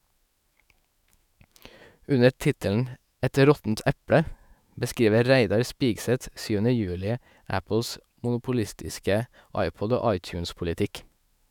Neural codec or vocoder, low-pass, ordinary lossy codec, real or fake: autoencoder, 48 kHz, 128 numbers a frame, DAC-VAE, trained on Japanese speech; 19.8 kHz; none; fake